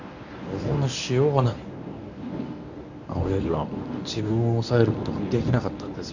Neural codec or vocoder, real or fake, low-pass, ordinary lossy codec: codec, 24 kHz, 0.9 kbps, WavTokenizer, medium speech release version 1; fake; 7.2 kHz; none